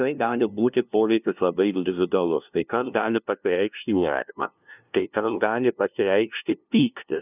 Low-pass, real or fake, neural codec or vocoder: 3.6 kHz; fake; codec, 16 kHz, 0.5 kbps, FunCodec, trained on LibriTTS, 25 frames a second